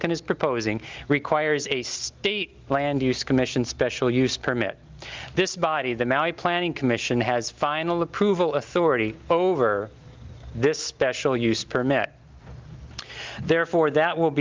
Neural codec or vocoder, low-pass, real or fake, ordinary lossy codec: none; 7.2 kHz; real; Opus, 24 kbps